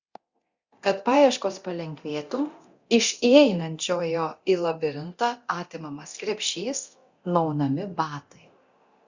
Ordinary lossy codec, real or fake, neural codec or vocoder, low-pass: Opus, 64 kbps; fake; codec, 24 kHz, 0.9 kbps, DualCodec; 7.2 kHz